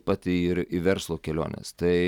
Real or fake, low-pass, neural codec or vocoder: real; 19.8 kHz; none